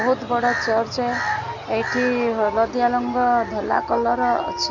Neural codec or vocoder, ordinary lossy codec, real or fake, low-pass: none; none; real; 7.2 kHz